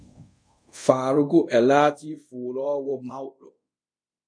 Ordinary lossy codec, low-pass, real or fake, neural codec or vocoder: MP3, 48 kbps; 9.9 kHz; fake; codec, 24 kHz, 0.5 kbps, DualCodec